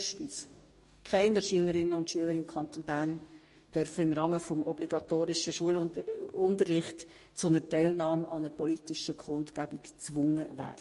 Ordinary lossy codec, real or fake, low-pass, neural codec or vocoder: MP3, 48 kbps; fake; 14.4 kHz; codec, 44.1 kHz, 2.6 kbps, DAC